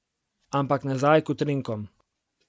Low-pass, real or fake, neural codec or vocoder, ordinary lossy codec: none; real; none; none